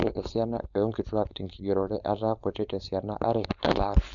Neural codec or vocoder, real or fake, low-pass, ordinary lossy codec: codec, 16 kHz, 4.8 kbps, FACodec; fake; 7.2 kHz; MP3, 96 kbps